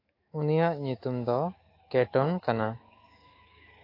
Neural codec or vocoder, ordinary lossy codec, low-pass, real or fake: autoencoder, 48 kHz, 128 numbers a frame, DAC-VAE, trained on Japanese speech; AAC, 32 kbps; 5.4 kHz; fake